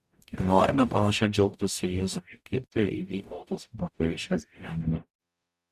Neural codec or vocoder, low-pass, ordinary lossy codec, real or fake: codec, 44.1 kHz, 0.9 kbps, DAC; 14.4 kHz; Opus, 64 kbps; fake